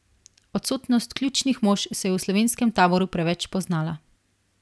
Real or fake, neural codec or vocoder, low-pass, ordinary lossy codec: real; none; none; none